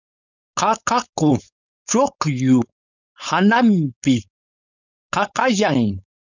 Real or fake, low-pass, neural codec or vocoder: fake; 7.2 kHz; codec, 16 kHz, 4.8 kbps, FACodec